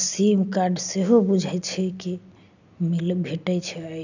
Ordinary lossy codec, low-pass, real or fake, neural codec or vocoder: none; 7.2 kHz; real; none